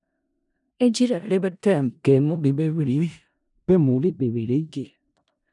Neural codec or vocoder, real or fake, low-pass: codec, 16 kHz in and 24 kHz out, 0.4 kbps, LongCat-Audio-Codec, four codebook decoder; fake; 10.8 kHz